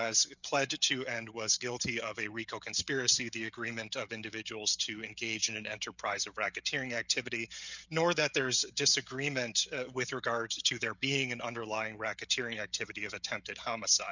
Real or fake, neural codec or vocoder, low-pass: fake; codec, 16 kHz, 16 kbps, FreqCodec, smaller model; 7.2 kHz